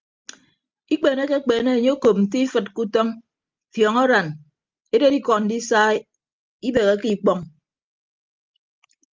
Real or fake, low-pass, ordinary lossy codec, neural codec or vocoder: real; 7.2 kHz; Opus, 24 kbps; none